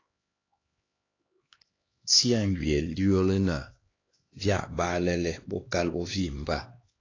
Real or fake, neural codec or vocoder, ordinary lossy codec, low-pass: fake; codec, 16 kHz, 2 kbps, X-Codec, HuBERT features, trained on LibriSpeech; AAC, 32 kbps; 7.2 kHz